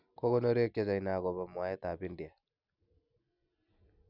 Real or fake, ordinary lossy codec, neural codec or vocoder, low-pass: real; none; none; 5.4 kHz